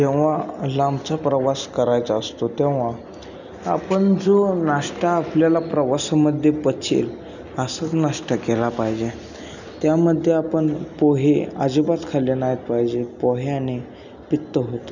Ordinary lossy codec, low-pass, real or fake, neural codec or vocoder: none; 7.2 kHz; real; none